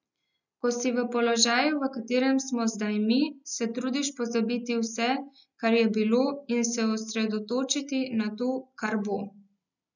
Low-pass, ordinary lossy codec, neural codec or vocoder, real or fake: 7.2 kHz; none; none; real